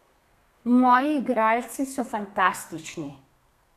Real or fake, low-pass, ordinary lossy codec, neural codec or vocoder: fake; 14.4 kHz; none; codec, 32 kHz, 1.9 kbps, SNAC